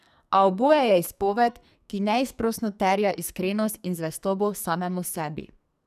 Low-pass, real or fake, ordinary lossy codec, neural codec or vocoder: 14.4 kHz; fake; none; codec, 44.1 kHz, 2.6 kbps, SNAC